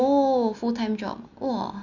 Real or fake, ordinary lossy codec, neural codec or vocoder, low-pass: real; none; none; 7.2 kHz